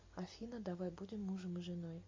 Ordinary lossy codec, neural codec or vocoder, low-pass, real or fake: MP3, 32 kbps; none; 7.2 kHz; real